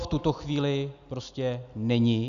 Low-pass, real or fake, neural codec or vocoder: 7.2 kHz; real; none